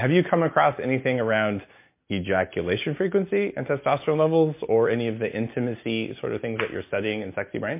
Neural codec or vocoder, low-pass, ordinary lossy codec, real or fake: none; 3.6 kHz; MP3, 24 kbps; real